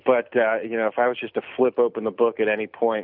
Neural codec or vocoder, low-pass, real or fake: none; 5.4 kHz; real